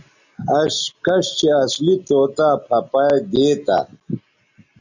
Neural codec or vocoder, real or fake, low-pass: none; real; 7.2 kHz